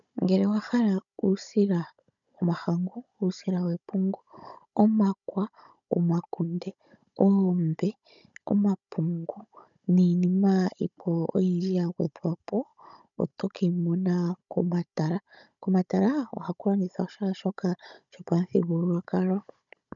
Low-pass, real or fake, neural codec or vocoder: 7.2 kHz; fake; codec, 16 kHz, 16 kbps, FunCodec, trained on Chinese and English, 50 frames a second